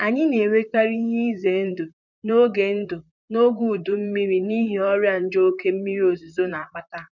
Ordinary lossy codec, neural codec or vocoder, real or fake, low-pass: none; vocoder, 44.1 kHz, 128 mel bands, Pupu-Vocoder; fake; 7.2 kHz